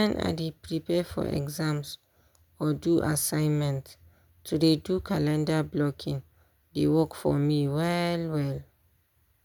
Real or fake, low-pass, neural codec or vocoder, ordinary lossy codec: real; none; none; none